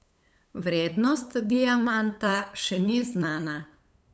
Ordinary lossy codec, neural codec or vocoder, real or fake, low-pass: none; codec, 16 kHz, 8 kbps, FunCodec, trained on LibriTTS, 25 frames a second; fake; none